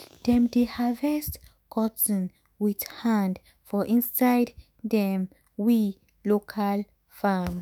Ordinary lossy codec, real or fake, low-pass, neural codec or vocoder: none; fake; none; autoencoder, 48 kHz, 128 numbers a frame, DAC-VAE, trained on Japanese speech